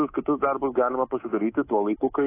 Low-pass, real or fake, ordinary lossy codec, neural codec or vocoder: 3.6 kHz; fake; AAC, 16 kbps; codec, 24 kHz, 3.1 kbps, DualCodec